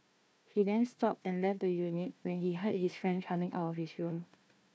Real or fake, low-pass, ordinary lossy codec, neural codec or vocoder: fake; none; none; codec, 16 kHz, 1 kbps, FunCodec, trained on Chinese and English, 50 frames a second